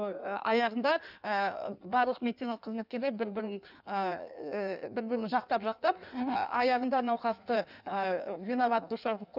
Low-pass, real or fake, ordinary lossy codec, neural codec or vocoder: 5.4 kHz; fake; none; codec, 16 kHz in and 24 kHz out, 1.1 kbps, FireRedTTS-2 codec